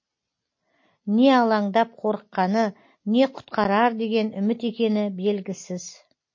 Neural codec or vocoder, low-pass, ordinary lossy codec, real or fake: none; 7.2 kHz; MP3, 32 kbps; real